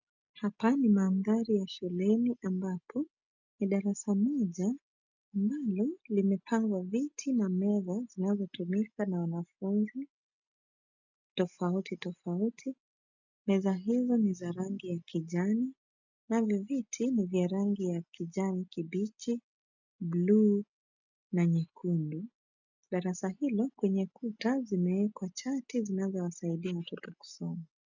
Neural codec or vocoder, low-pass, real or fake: none; 7.2 kHz; real